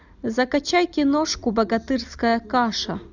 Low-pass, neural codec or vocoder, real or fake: 7.2 kHz; none; real